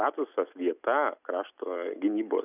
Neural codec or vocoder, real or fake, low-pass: none; real; 3.6 kHz